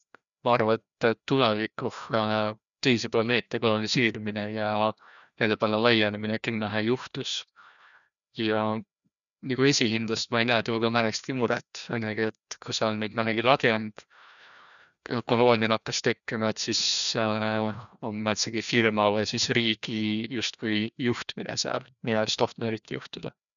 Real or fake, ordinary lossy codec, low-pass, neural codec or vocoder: fake; none; 7.2 kHz; codec, 16 kHz, 1 kbps, FreqCodec, larger model